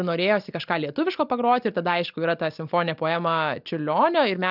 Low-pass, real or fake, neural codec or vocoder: 5.4 kHz; real; none